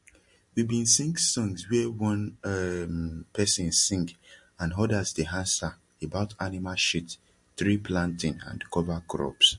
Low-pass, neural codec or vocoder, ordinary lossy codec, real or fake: 10.8 kHz; none; MP3, 48 kbps; real